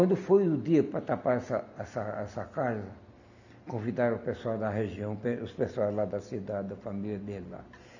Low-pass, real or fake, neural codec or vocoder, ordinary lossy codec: 7.2 kHz; real; none; none